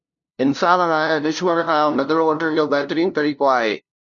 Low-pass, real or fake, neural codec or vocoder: 7.2 kHz; fake; codec, 16 kHz, 0.5 kbps, FunCodec, trained on LibriTTS, 25 frames a second